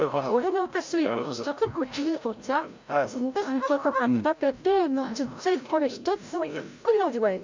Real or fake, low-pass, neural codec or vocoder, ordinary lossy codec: fake; 7.2 kHz; codec, 16 kHz, 0.5 kbps, FreqCodec, larger model; MP3, 64 kbps